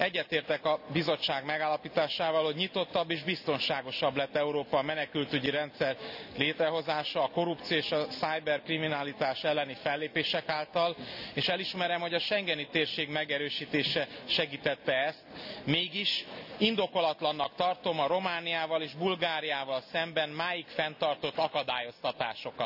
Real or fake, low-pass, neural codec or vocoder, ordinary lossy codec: real; 5.4 kHz; none; none